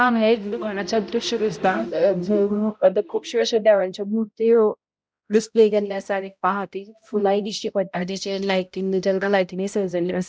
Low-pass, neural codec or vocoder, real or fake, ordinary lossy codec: none; codec, 16 kHz, 0.5 kbps, X-Codec, HuBERT features, trained on balanced general audio; fake; none